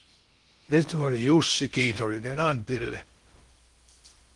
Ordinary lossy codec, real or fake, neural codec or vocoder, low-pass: Opus, 24 kbps; fake; codec, 16 kHz in and 24 kHz out, 0.6 kbps, FocalCodec, streaming, 2048 codes; 10.8 kHz